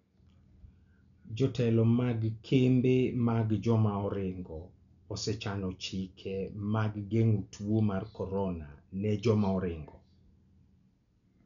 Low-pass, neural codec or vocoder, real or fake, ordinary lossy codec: 7.2 kHz; none; real; none